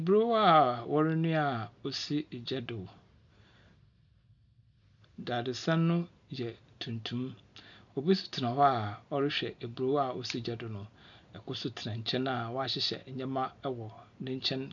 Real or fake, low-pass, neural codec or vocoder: real; 7.2 kHz; none